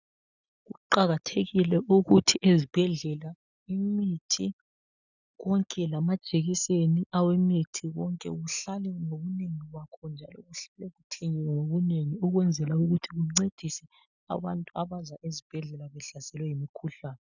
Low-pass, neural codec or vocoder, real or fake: 7.2 kHz; none; real